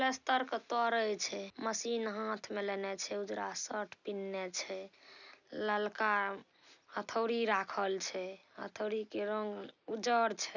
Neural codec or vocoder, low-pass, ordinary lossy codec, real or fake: none; 7.2 kHz; none; real